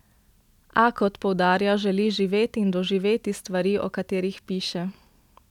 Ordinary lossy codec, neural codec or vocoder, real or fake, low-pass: none; none; real; 19.8 kHz